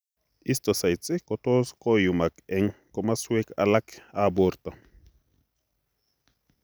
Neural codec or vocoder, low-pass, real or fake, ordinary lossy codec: none; none; real; none